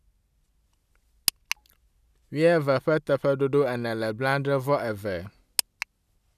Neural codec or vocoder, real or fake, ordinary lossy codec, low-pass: none; real; none; 14.4 kHz